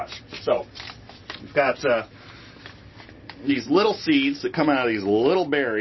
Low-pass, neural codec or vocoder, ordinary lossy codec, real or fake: 7.2 kHz; none; MP3, 24 kbps; real